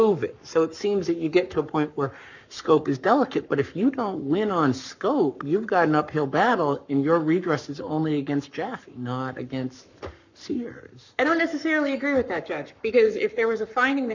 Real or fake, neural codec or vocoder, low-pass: fake; codec, 44.1 kHz, 7.8 kbps, Pupu-Codec; 7.2 kHz